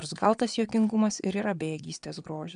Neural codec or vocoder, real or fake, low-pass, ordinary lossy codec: vocoder, 22.05 kHz, 80 mel bands, Vocos; fake; 9.9 kHz; MP3, 96 kbps